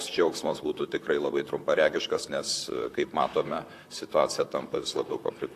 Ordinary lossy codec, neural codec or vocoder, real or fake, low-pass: AAC, 64 kbps; vocoder, 44.1 kHz, 128 mel bands, Pupu-Vocoder; fake; 14.4 kHz